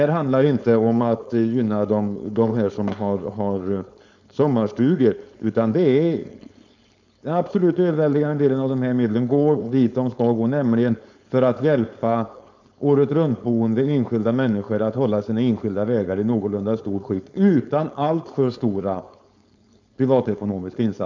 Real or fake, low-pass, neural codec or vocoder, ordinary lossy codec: fake; 7.2 kHz; codec, 16 kHz, 4.8 kbps, FACodec; MP3, 64 kbps